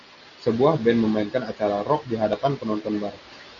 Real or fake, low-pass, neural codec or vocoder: real; 7.2 kHz; none